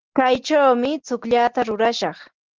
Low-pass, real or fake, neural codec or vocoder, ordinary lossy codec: 7.2 kHz; real; none; Opus, 16 kbps